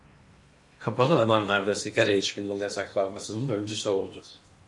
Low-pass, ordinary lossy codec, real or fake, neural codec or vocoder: 10.8 kHz; MP3, 48 kbps; fake; codec, 16 kHz in and 24 kHz out, 0.8 kbps, FocalCodec, streaming, 65536 codes